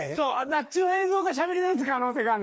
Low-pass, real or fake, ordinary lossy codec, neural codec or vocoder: none; fake; none; codec, 16 kHz, 2 kbps, FreqCodec, larger model